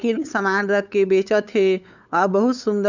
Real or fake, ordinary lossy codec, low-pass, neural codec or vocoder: fake; none; 7.2 kHz; codec, 16 kHz, 8 kbps, FunCodec, trained on LibriTTS, 25 frames a second